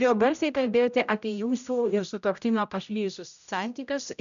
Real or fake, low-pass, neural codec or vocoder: fake; 7.2 kHz; codec, 16 kHz, 0.5 kbps, X-Codec, HuBERT features, trained on general audio